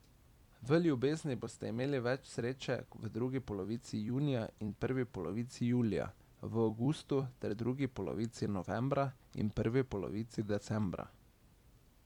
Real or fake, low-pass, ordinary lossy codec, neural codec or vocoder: fake; 19.8 kHz; MP3, 96 kbps; vocoder, 44.1 kHz, 128 mel bands every 256 samples, BigVGAN v2